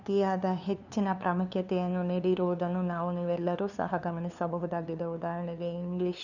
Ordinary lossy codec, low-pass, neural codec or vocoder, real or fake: none; 7.2 kHz; codec, 16 kHz, 2 kbps, FunCodec, trained on LibriTTS, 25 frames a second; fake